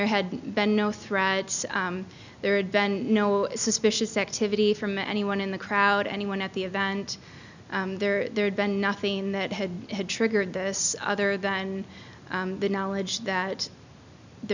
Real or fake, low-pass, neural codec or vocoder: real; 7.2 kHz; none